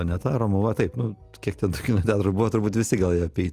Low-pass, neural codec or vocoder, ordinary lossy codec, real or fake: 14.4 kHz; none; Opus, 32 kbps; real